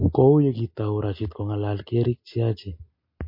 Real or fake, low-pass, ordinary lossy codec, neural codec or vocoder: real; 5.4 kHz; MP3, 32 kbps; none